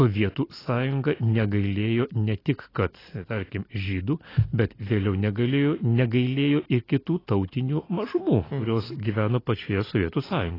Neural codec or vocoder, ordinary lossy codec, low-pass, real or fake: none; AAC, 24 kbps; 5.4 kHz; real